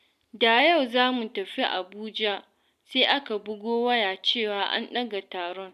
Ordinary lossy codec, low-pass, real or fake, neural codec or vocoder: none; 14.4 kHz; real; none